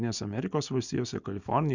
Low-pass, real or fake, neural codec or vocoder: 7.2 kHz; real; none